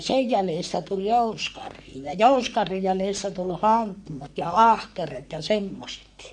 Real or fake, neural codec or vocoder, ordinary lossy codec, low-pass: fake; codec, 44.1 kHz, 3.4 kbps, Pupu-Codec; none; 10.8 kHz